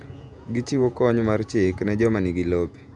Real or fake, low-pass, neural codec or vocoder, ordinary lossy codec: fake; 10.8 kHz; vocoder, 48 kHz, 128 mel bands, Vocos; none